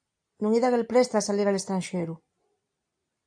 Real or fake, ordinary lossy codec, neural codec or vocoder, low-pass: real; AAC, 64 kbps; none; 9.9 kHz